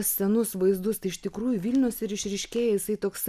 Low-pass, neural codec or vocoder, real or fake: 14.4 kHz; none; real